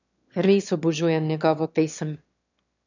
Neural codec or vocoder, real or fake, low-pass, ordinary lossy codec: autoencoder, 22.05 kHz, a latent of 192 numbers a frame, VITS, trained on one speaker; fake; 7.2 kHz; none